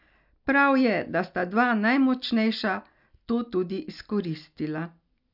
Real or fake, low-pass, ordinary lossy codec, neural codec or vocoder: real; 5.4 kHz; none; none